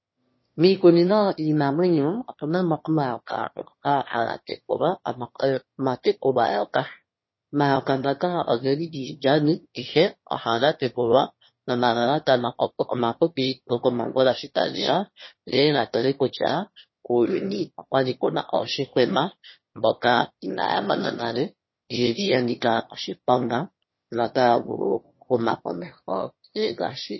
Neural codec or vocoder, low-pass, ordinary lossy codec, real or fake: autoencoder, 22.05 kHz, a latent of 192 numbers a frame, VITS, trained on one speaker; 7.2 kHz; MP3, 24 kbps; fake